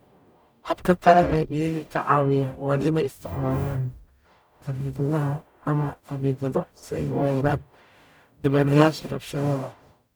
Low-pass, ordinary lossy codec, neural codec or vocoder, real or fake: none; none; codec, 44.1 kHz, 0.9 kbps, DAC; fake